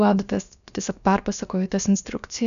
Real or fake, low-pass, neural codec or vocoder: fake; 7.2 kHz; codec, 16 kHz, about 1 kbps, DyCAST, with the encoder's durations